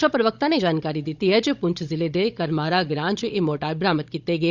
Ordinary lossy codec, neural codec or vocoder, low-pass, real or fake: Opus, 64 kbps; codec, 16 kHz, 16 kbps, FunCodec, trained on Chinese and English, 50 frames a second; 7.2 kHz; fake